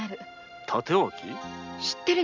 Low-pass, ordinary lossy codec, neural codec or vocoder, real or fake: 7.2 kHz; none; none; real